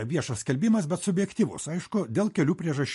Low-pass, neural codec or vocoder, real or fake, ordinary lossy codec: 14.4 kHz; none; real; MP3, 48 kbps